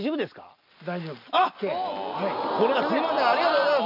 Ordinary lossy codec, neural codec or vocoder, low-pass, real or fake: none; none; 5.4 kHz; real